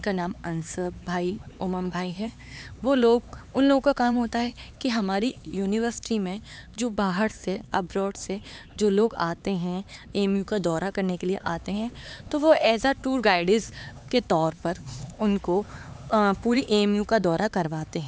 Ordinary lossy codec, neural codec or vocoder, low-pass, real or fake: none; codec, 16 kHz, 4 kbps, X-Codec, HuBERT features, trained on LibriSpeech; none; fake